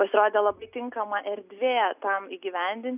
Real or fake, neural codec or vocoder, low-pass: real; none; 3.6 kHz